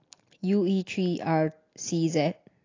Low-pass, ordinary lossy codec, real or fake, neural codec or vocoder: 7.2 kHz; AAC, 32 kbps; real; none